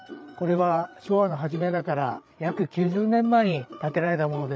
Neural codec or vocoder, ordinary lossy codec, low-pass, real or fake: codec, 16 kHz, 8 kbps, FreqCodec, larger model; none; none; fake